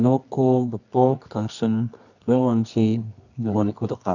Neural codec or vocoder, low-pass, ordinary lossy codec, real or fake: codec, 24 kHz, 0.9 kbps, WavTokenizer, medium music audio release; 7.2 kHz; none; fake